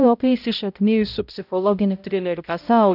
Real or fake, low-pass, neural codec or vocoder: fake; 5.4 kHz; codec, 16 kHz, 0.5 kbps, X-Codec, HuBERT features, trained on balanced general audio